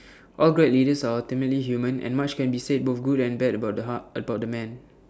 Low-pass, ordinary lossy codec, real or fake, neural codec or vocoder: none; none; real; none